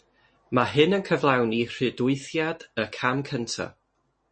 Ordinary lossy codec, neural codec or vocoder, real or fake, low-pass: MP3, 32 kbps; none; real; 9.9 kHz